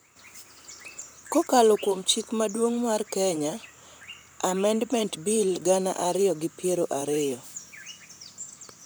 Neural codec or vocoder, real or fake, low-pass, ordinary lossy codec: vocoder, 44.1 kHz, 128 mel bands, Pupu-Vocoder; fake; none; none